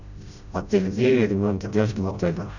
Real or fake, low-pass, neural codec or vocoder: fake; 7.2 kHz; codec, 16 kHz, 0.5 kbps, FreqCodec, smaller model